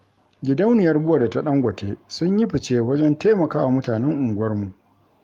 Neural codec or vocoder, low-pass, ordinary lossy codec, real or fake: codec, 44.1 kHz, 7.8 kbps, Pupu-Codec; 19.8 kHz; Opus, 32 kbps; fake